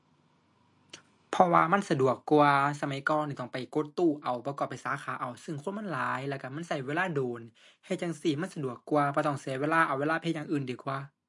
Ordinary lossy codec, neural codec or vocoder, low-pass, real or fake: MP3, 48 kbps; none; 10.8 kHz; real